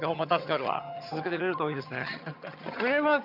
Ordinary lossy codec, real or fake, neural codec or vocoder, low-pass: none; fake; vocoder, 22.05 kHz, 80 mel bands, HiFi-GAN; 5.4 kHz